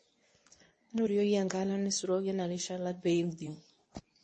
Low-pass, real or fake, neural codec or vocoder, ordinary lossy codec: 10.8 kHz; fake; codec, 24 kHz, 0.9 kbps, WavTokenizer, medium speech release version 2; MP3, 32 kbps